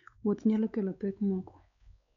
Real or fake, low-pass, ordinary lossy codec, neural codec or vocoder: fake; 7.2 kHz; none; codec, 16 kHz, 4 kbps, X-Codec, WavLM features, trained on Multilingual LibriSpeech